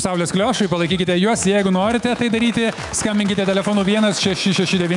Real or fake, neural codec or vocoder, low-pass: fake; autoencoder, 48 kHz, 128 numbers a frame, DAC-VAE, trained on Japanese speech; 10.8 kHz